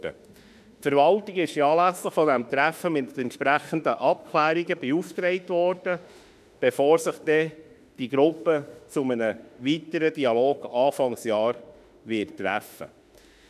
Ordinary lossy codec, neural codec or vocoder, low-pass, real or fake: none; autoencoder, 48 kHz, 32 numbers a frame, DAC-VAE, trained on Japanese speech; 14.4 kHz; fake